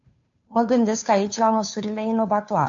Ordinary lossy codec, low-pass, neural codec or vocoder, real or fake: AAC, 64 kbps; 7.2 kHz; codec, 16 kHz, 2 kbps, FunCodec, trained on Chinese and English, 25 frames a second; fake